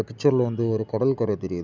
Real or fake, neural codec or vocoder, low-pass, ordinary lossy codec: real; none; none; none